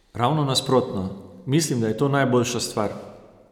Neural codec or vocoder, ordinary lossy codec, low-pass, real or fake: none; none; 19.8 kHz; real